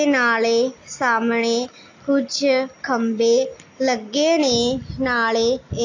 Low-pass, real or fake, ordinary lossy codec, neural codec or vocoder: 7.2 kHz; real; AAC, 48 kbps; none